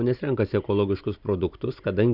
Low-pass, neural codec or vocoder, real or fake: 5.4 kHz; none; real